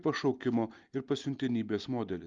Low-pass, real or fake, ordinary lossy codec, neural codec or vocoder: 7.2 kHz; real; Opus, 32 kbps; none